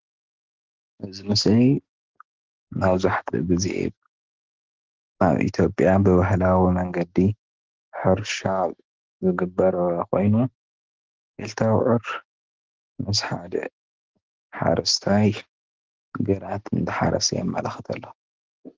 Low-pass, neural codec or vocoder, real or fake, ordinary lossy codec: 7.2 kHz; none; real; Opus, 16 kbps